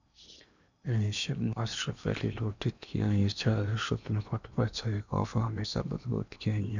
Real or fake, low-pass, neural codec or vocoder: fake; 7.2 kHz; codec, 16 kHz in and 24 kHz out, 0.8 kbps, FocalCodec, streaming, 65536 codes